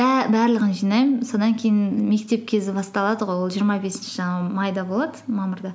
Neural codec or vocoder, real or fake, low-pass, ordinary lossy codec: none; real; none; none